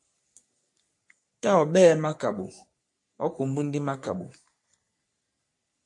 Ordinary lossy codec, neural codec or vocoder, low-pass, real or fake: MP3, 48 kbps; codec, 44.1 kHz, 3.4 kbps, Pupu-Codec; 10.8 kHz; fake